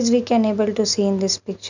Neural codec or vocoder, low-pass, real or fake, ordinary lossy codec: none; 7.2 kHz; real; none